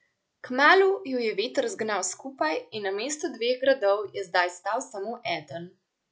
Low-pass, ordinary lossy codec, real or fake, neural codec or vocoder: none; none; real; none